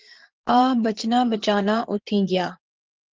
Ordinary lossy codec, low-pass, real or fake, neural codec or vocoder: Opus, 16 kbps; 7.2 kHz; fake; vocoder, 22.05 kHz, 80 mel bands, Vocos